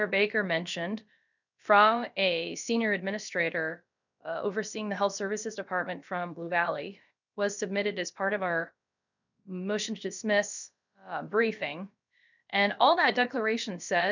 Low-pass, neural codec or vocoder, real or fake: 7.2 kHz; codec, 16 kHz, about 1 kbps, DyCAST, with the encoder's durations; fake